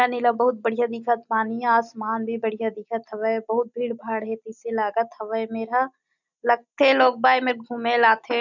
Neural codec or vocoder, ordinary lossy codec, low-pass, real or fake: none; none; 7.2 kHz; real